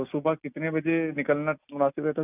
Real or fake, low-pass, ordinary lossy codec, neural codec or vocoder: real; 3.6 kHz; none; none